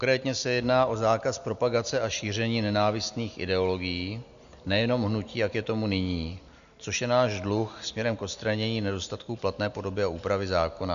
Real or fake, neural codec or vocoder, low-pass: real; none; 7.2 kHz